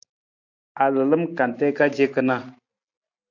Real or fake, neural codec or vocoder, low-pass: real; none; 7.2 kHz